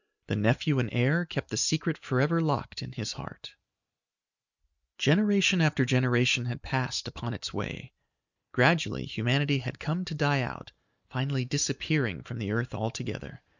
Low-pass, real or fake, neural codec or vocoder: 7.2 kHz; real; none